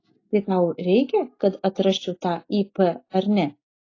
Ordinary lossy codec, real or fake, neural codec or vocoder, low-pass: AAC, 32 kbps; real; none; 7.2 kHz